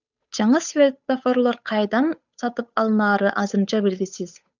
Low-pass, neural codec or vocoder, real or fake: 7.2 kHz; codec, 16 kHz, 8 kbps, FunCodec, trained on Chinese and English, 25 frames a second; fake